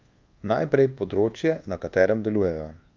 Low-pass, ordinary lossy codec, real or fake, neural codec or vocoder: 7.2 kHz; Opus, 24 kbps; fake; codec, 24 kHz, 1.2 kbps, DualCodec